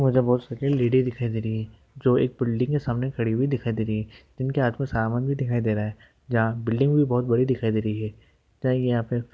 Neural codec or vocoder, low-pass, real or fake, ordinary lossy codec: none; none; real; none